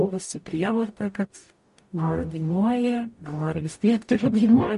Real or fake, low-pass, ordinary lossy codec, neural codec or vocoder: fake; 14.4 kHz; MP3, 48 kbps; codec, 44.1 kHz, 0.9 kbps, DAC